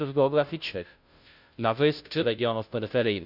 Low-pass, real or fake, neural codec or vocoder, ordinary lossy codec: 5.4 kHz; fake; codec, 16 kHz, 0.5 kbps, FunCodec, trained on Chinese and English, 25 frames a second; none